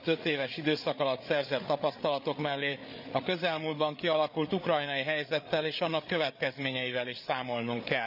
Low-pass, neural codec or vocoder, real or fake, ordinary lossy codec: 5.4 kHz; codec, 16 kHz, 8 kbps, FreqCodec, larger model; fake; none